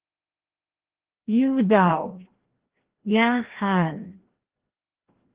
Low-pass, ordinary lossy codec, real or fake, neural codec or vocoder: 3.6 kHz; Opus, 16 kbps; fake; codec, 16 kHz, 1 kbps, FreqCodec, larger model